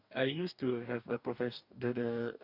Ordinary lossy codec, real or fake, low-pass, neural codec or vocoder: none; fake; 5.4 kHz; codec, 44.1 kHz, 2.6 kbps, DAC